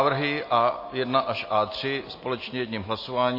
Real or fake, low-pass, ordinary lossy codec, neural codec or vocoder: real; 5.4 kHz; MP3, 24 kbps; none